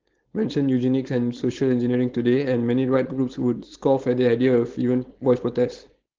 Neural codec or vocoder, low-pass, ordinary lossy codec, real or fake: codec, 16 kHz, 4.8 kbps, FACodec; 7.2 kHz; Opus, 16 kbps; fake